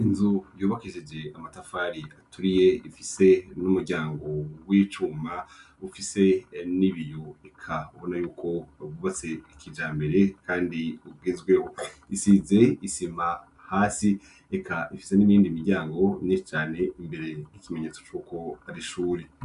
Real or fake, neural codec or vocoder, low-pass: real; none; 10.8 kHz